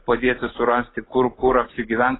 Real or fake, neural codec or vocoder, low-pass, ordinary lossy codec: real; none; 7.2 kHz; AAC, 16 kbps